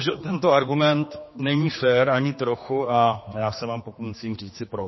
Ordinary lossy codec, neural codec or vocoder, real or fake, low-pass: MP3, 24 kbps; codec, 16 kHz, 2 kbps, X-Codec, HuBERT features, trained on general audio; fake; 7.2 kHz